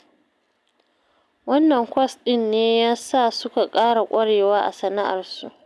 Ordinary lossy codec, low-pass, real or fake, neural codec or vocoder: none; none; real; none